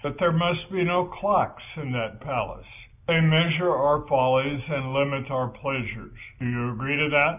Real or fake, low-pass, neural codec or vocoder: real; 3.6 kHz; none